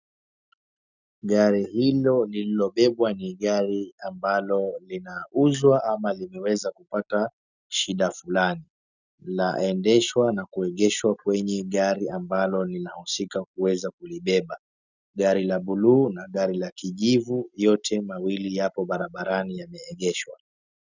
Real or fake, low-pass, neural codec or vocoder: real; 7.2 kHz; none